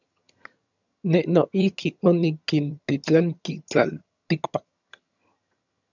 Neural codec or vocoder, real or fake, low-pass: vocoder, 22.05 kHz, 80 mel bands, HiFi-GAN; fake; 7.2 kHz